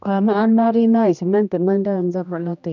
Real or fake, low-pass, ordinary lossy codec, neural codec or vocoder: fake; 7.2 kHz; none; codec, 16 kHz, 1 kbps, X-Codec, HuBERT features, trained on general audio